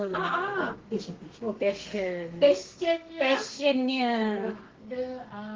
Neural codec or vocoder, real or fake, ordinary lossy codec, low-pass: codec, 32 kHz, 1.9 kbps, SNAC; fake; Opus, 16 kbps; 7.2 kHz